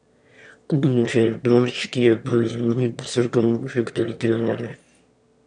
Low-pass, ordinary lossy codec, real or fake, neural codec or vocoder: 9.9 kHz; none; fake; autoencoder, 22.05 kHz, a latent of 192 numbers a frame, VITS, trained on one speaker